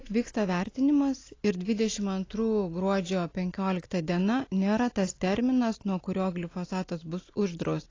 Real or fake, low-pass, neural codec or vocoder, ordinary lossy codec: real; 7.2 kHz; none; AAC, 32 kbps